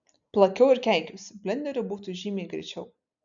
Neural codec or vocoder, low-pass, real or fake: none; 7.2 kHz; real